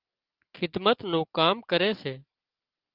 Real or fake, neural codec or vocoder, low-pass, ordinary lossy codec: real; none; 5.4 kHz; Opus, 32 kbps